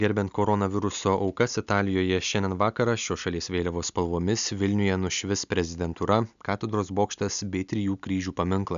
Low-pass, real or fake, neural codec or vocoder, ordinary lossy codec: 7.2 kHz; real; none; MP3, 96 kbps